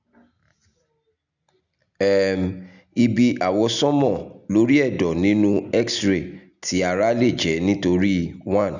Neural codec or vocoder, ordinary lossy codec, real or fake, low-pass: none; none; real; 7.2 kHz